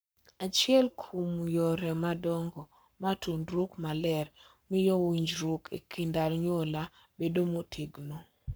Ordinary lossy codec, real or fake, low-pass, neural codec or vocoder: none; fake; none; codec, 44.1 kHz, 7.8 kbps, Pupu-Codec